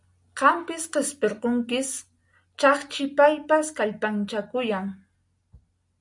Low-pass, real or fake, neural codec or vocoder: 10.8 kHz; real; none